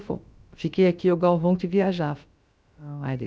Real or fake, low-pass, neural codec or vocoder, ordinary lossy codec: fake; none; codec, 16 kHz, about 1 kbps, DyCAST, with the encoder's durations; none